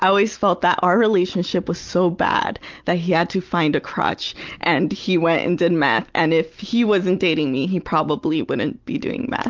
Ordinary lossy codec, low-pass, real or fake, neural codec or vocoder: Opus, 24 kbps; 7.2 kHz; real; none